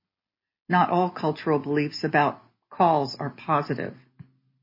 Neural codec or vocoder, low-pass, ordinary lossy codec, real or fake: none; 5.4 kHz; MP3, 24 kbps; real